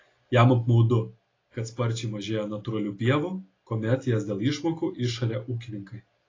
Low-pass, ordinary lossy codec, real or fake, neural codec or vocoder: 7.2 kHz; AAC, 32 kbps; real; none